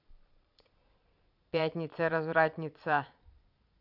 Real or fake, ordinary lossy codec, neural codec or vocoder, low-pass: fake; none; vocoder, 22.05 kHz, 80 mel bands, Vocos; 5.4 kHz